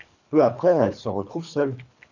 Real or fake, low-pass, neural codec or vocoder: fake; 7.2 kHz; codec, 24 kHz, 3 kbps, HILCodec